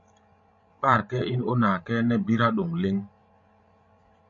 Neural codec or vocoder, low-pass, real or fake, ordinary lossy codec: codec, 16 kHz, 16 kbps, FreqCodec, larger model; 7.2 kHz; fake; MP3, 48 kbps